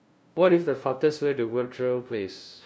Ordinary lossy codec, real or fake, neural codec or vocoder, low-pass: none; fake; codec, 16 kHz, 0.5 kbps, FunCodec, trained on LibriTTS, 25 frames a second; none